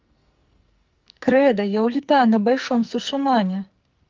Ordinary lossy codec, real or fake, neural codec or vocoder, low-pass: Opus, 32 kbps; fake; codec, 44.1 kHz, 2.6 kbps, SNAC; 7.2 kHz